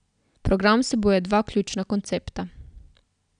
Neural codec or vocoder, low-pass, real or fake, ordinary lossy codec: none; 9.9 kHz; real; none